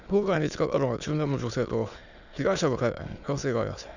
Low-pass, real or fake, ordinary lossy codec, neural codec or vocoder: 7.2 kHz; fake; none; autoencoder, 22.05 kHz, a latent of 192 numbers a frame, VITS, trained on many speakers